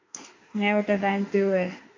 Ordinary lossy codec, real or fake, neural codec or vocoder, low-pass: AAC, 32 kbps; fake; autoencoder, 48 kHz, 32 numbers a frame, DAC-VAE, trained on Japanese speech; 7.2 kHz